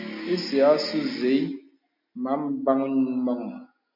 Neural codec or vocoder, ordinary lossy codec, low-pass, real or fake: none; MP3, 48 kbps; 5.4 kHz; real